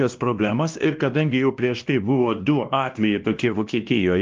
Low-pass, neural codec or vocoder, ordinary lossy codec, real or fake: 7.2 kHz; codec, 16 kHz, 1 kbps, X-Codec, WavLM features, trained on Multilingual LibriSpeech; Opus, 32 kbps; fake